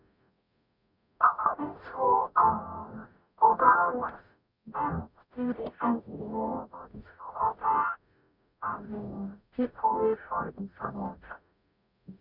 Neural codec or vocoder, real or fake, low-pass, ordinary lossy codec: codec, 44.1 kHz, 0.9 kbps, DAC; fake; 5.4 kHz; none